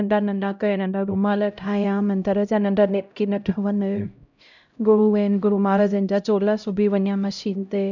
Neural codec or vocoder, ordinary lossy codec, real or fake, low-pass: codec, 16 kHz, 0.5 kbps, X-Codec, HuBERT features, trained on LibriSpeech; none; fake; 7.2 kHz